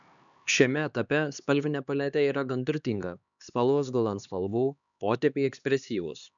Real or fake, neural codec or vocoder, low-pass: fake; codec, 16 kHz, 2 kbps, X-Codec, HuBERT features, trained on LibriSpeech; 7.2 kHz